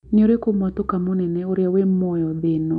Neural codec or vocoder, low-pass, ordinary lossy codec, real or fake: none; 10.8 kHz; none; real